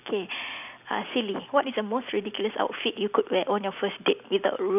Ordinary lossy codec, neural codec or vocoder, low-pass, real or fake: none; none; 3.6 kHz; real